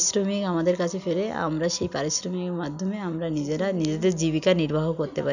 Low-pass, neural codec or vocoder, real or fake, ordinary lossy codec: 7.2 kHz; none; real; none